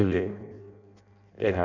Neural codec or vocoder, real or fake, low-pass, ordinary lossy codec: codec, 16 kHz in and 24 kHz out, 0.6 kbps, FireRedTTS-2 codec; fake; 7.2 kHz; none